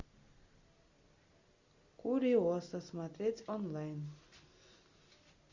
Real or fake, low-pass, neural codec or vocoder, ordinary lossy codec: real; 7.2 kHz; none; Opus, 64 kbps